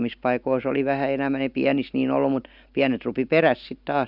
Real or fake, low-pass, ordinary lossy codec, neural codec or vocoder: real; 5.4 kHz; none; none